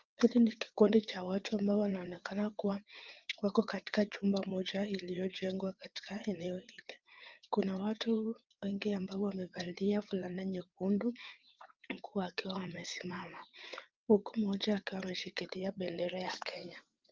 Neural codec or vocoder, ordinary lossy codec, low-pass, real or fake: vocoder, 22.05 kHz, 80 mel bands, WaveNeXt; Opus, 32 kbps; 7.2 kHz; fake